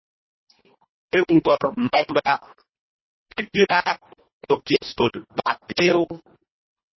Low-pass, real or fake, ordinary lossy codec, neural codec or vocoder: 7.2 kHz; fake; MP3, 24 kbps; codec, 24 kHz, 1.5 kbps, HILCodec